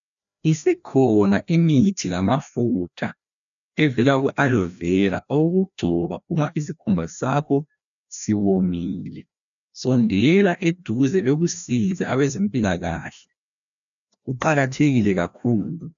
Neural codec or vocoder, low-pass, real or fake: codec, 16 kHz, 1 kbps, FreqCodec, larger model; 7.2 kHz; fake